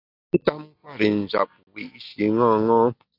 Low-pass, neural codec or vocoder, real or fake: 5.4 kHz; none; real